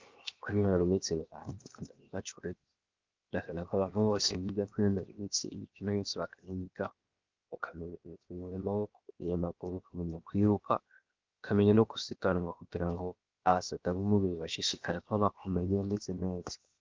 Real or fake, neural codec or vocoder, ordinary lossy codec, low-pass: fake; codec, 16 kHz, 0.7 kbps, FocalCodec; Opus, 32 kbps; 7.2 kHz